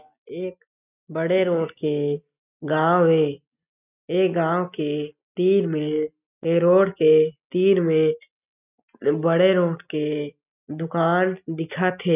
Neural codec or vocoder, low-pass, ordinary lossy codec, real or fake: none; 3.6 kHz; none; real